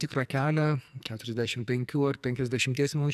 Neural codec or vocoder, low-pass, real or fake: codec, 44.1 kHz, 2.6 kbps, SNAC; 14.4 kHz; fake